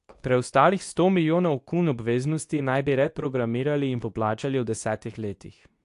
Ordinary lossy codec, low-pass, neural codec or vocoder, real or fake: AAC, 64 kbps; 10.8 kHz; codec, 24 kHz, 0.9 kbps, WavTokenizer, medium speech release version 2; fake